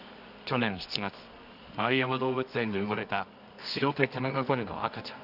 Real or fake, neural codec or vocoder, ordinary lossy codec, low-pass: fake; codec, 24 kHz, 0.9 kbps, WavTokenizer, medium music audio release; none; 5.4 kHz